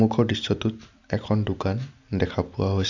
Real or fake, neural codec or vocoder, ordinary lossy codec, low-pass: real; none; none; 7.2 kHz